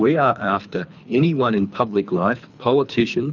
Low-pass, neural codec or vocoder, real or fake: 7.2 kHz; codec, 24 kHz, 3 kbps, HILCodec; fake